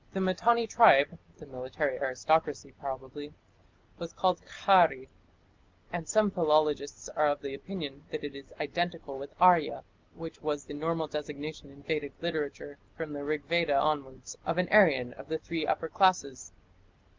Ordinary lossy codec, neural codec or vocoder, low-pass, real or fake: Opus, 32 kbps; none; 7.2 kHz; real